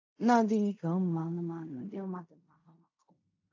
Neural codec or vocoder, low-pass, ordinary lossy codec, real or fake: codec, 16 kHz in and 24 kHz out, 0.4 kbps, LongCat-Audio-Codec, fine tuned four codebook decoder; 7.2 kHz; AAC, 48 kbps; fake